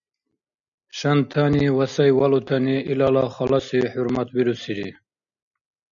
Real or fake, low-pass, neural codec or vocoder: real; 7.2 kHz; none